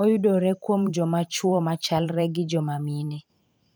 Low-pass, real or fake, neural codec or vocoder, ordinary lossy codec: none; fake; vocoder, 44.1 kHz, 128 mel bands every 512 samples, BigVGAN v2; none